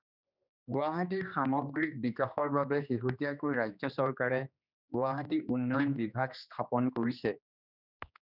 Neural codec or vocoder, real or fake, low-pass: codec, 16 kHz, 2 kbps, X-Codec, HuBERT features, trained on general audio; fake; 5.4 kHz